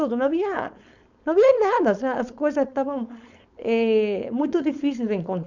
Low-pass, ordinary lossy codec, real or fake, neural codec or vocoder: 7.2 kHz; none; fake; codec, 16 kHz, 4.8 kbps, FACodec